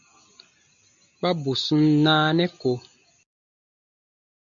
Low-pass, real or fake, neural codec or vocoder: 7.2 kHz; real; none